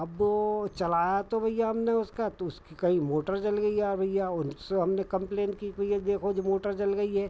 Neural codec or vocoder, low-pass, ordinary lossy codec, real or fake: none; none; none; real